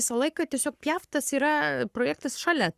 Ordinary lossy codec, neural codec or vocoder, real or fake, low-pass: Opus, 64 kbps; codec, 44.1 kHz, 7.8 kbps, Pupu-Codec; fake; 14.4 kHz